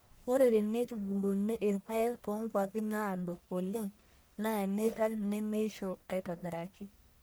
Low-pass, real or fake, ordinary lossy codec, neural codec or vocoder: none; fake; none; codec, 44.1 kHz, 1.7 kbps, Pupu-Codec